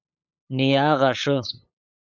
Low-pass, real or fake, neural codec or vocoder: 7.2 kHz; fake; codec, 16 kHz, 8 kbps, FunCodec, trained on LibriTTS, 25 frames a second